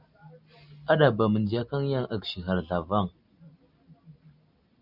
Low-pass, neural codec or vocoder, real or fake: 5.4 kHz; none; real